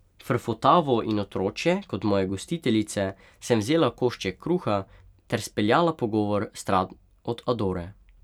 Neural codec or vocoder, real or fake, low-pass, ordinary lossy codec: vocoder, 44.1 kHz, 128 mel bands every 256 samples, BigVGAN v2; fake; 19.8 kHz; none